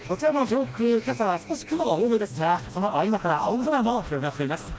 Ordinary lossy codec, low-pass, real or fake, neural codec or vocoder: none; none; fake; codec, 16 kHz, 1 kbps, FreqCodec, smaller model